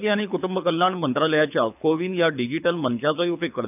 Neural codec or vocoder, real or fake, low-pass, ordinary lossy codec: codec, 24 kHz, 6 kbps, HILCodec; fake; 3.6 kHz; none